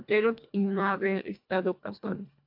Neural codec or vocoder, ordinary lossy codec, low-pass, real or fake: codec, 24 kHz, 1.5 kbps, HILCodec; AAC, 48 kbps; 5.4 kHz; fake